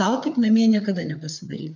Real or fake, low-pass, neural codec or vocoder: fake; 7.2 kHz; codec, 16 kHz, 4 kbps, FreqCodec, larger model